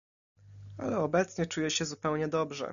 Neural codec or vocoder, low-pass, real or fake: none; 7.2 kHz; real